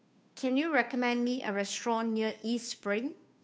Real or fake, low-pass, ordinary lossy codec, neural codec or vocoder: fake; none; none; codec, 16 kHz, 2 kbps, FunCodec, trained on Chinese and English, 25 frames a second